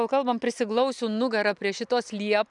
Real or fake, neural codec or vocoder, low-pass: real; none; 10.8 kHz